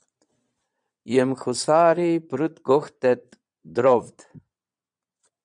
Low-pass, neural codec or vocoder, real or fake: 9.9 kHz; vocoder, 22.05 kHz, 80 mel bands, Vocos; fake